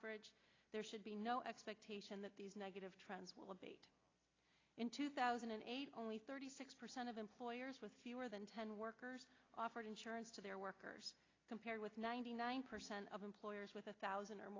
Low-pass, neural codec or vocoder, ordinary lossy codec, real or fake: 7.2 kHz; none; AAC, 32 kbps; real